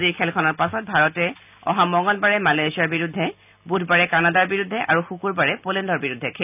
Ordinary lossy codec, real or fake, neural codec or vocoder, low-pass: none; real; none; 3.6 kHz